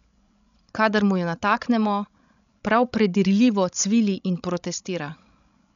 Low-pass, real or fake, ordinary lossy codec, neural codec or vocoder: 7.2 kHz; fake; MP3, 96 kbps; codec, 16 kHz, 16 kbps, FreqCodec, larger model